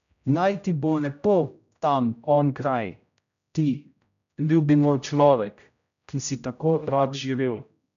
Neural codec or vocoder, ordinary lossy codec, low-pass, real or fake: codec, 16 kHz, 0.5 kbps, X-Codec, HuBERT features, trained on general audio; none; 7.2 kHz; fake